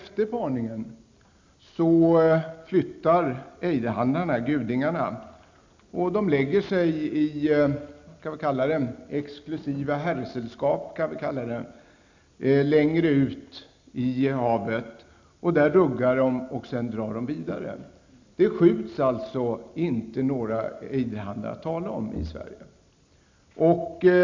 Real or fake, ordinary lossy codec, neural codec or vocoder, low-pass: real; MP3, 64 kbps; none; 7.2 kHz